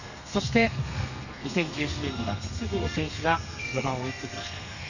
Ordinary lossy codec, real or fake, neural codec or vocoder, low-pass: none; fake; codec, 32 kHz, 1.9 kbps, SNAC; 7.2 kHz